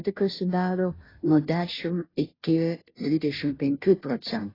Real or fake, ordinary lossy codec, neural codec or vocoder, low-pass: fake; AAC, 24 kbps; codec, 16 kHz, 0.5 kbps, FunCodec, trained on Chinese and English, 25 frames a second; 5.4 kHz